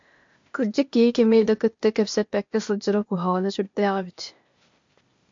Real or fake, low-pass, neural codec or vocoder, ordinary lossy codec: fake; 7.2 kHz; codec, 16 kHz, 0.8 kbps, ZipCodec; MP3, 48 kbps